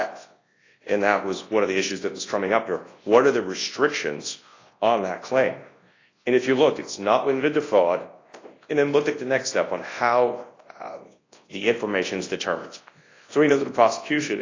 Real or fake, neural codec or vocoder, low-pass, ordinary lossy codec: fake; codec, 24 kHz, 0.9 kbps, WavTokenizer, large speech release; 7.2 kHz; AAC, 32 kbps